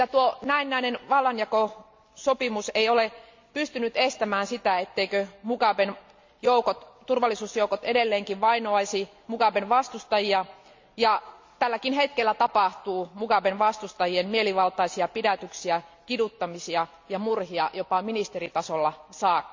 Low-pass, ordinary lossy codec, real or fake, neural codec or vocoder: 7.2 kHz; MP3, 64 kbps; real; none